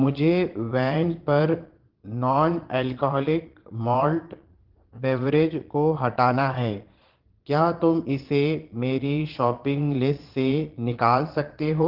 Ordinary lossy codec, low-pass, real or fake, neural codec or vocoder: Opus, 16 kbps; 5.4 kHz; fake; vocoder, 22.05 kHz, 80 mel bands, Vocos